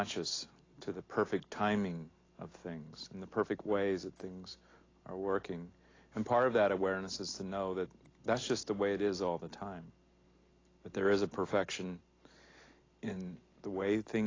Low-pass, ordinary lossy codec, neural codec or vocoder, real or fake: 7.2 kHz; AAC, 32 kbps; none; real